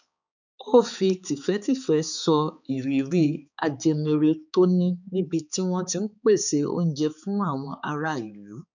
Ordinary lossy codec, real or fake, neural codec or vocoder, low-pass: none; fake; codec, 16 kHz, 4 kbps, X-Codec, HuBERT features, trained on balanced general audio; 7.2 kHz